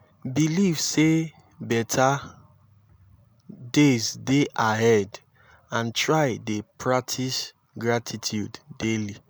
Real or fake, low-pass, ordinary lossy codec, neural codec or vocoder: real; none; none; none